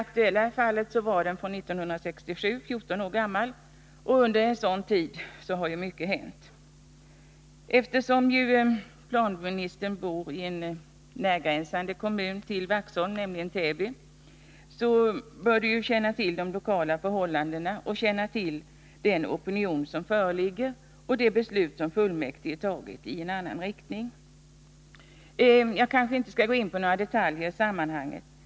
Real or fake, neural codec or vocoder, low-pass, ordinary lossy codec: real; none; none; none